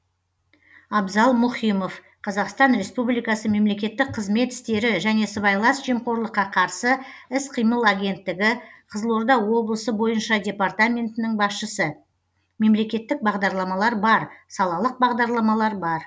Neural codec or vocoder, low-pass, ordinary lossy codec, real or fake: none; none; none; real